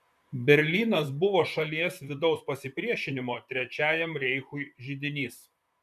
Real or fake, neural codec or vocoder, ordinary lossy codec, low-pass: fake; vocoder, 44.1 kHz, 128 mel bands, Pupu-Vocoder; MP3, 96 kbps; 14.4 kHz